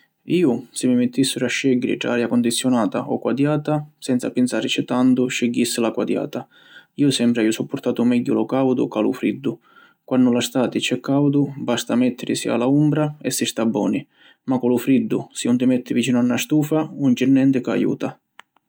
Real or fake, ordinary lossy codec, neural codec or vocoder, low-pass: real; none; none; none